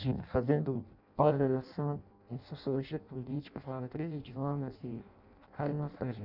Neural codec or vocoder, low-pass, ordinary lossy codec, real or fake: codec, 16 kHz in and 24 kHz out, 0.6 kbps, FireRedTTS-2 codec; 5.4 kHz; none; fake